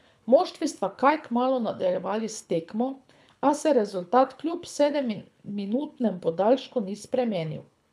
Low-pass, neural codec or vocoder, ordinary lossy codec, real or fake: none; codec, 24 kHz, 6 kbps, HILCodec; none; fake